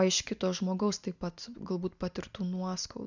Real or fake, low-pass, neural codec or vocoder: real; 7.2 kHz; none